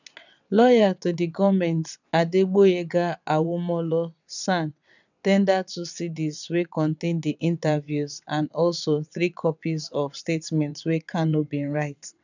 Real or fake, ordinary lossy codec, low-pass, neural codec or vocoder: fake; none; 7.2 kHz; vocoder, 22.05 kHz, 80 mel bands, WaveNeXt